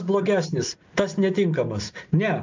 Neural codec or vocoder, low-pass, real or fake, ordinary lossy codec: none; 7.2 kHz; real; MP3, 64 kbps